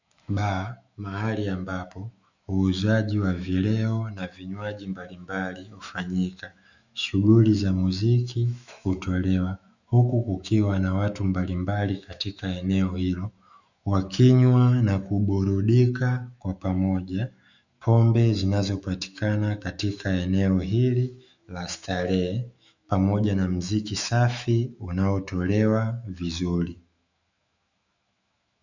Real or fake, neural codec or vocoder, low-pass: fake; autoencoder, 48 kHz, 128 numbers a frame, DAC-VAE, trained on Japanese speech; 7.2 kHz